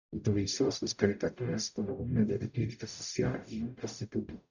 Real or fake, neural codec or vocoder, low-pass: fake; codec, 44.1 kHz, 0.9 kbps, DAC; 7.2 kHz